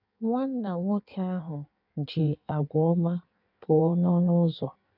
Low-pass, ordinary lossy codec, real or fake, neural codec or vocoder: 5.4 kHz; none; fake; codec, 16 kHz in and 24 kHz out, 1.1 kbps, FireRedTTS-2 codec